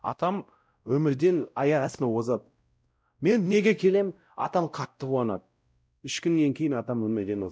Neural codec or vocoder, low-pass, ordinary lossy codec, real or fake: codec, 16 kHz, 0.5 kbps, X-Codec, WavLM features, trained on Multilingual LibriSpeech; none; none; fake